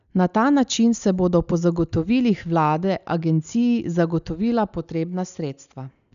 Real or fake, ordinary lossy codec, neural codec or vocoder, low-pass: real; none; none; 7.2 kHz